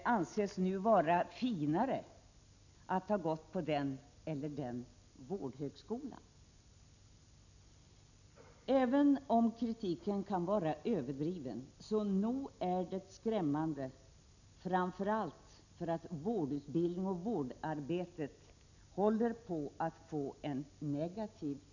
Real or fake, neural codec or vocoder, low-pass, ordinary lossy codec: real; none; 7.2 kHz; none